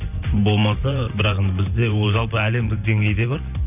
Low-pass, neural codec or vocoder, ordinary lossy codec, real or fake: 3.6 kHz; vocoder, 44.1 kHz, 128 mel bands every 512 samples, BigVGAN v2; none; fake